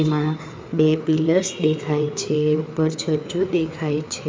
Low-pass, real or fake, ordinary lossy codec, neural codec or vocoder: none; fake; none; codec, 16 kHz, 4 kbps, FreqCodec, larger model